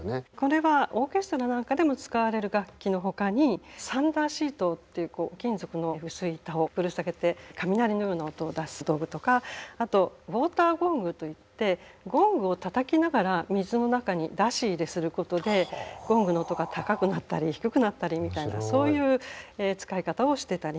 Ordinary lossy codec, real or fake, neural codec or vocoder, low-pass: none; real; none; none